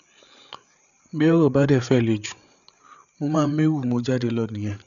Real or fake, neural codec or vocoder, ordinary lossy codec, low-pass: fake; codec, 16 kHz, 8 kbps, FreqCodec, larger model; none; 7.2 kHz